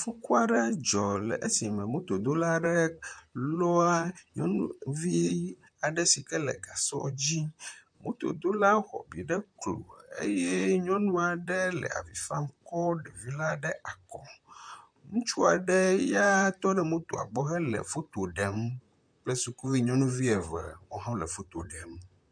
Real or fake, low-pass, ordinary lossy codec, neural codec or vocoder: fake; 9.9 kHz; MP3, 64 kbps; vocoder, 44.1 kHz, 128 mel bands, Pupu-Vocoder